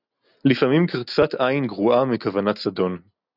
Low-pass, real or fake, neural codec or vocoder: 5.4 kHz; real; none